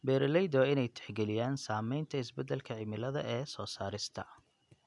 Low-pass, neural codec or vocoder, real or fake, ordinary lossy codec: 10.8 kHz; none; real; none